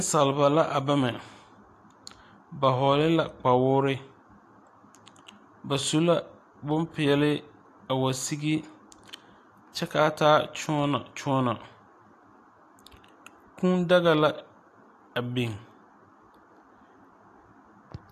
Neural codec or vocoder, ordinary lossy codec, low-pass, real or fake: none; AAC, 64 kbps; 14.4 kHz; real